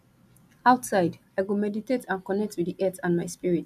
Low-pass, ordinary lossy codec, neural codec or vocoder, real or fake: 14.4 kHz; none; none; real